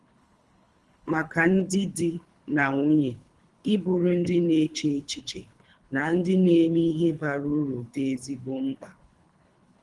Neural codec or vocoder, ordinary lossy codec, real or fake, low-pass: codec, 24 kHz, 3 kbps, HILCodec; Opus, 24 kbps; fake; 10.8 kHz